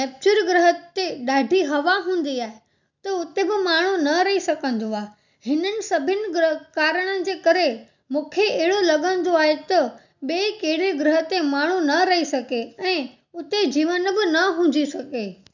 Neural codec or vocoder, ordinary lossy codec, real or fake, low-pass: none; none; real; 7.2 kHz